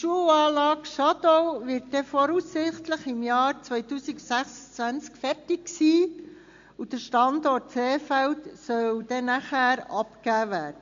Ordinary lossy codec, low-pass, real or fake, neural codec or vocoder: MP3, 48 kbps; 7.2 kHz; real; none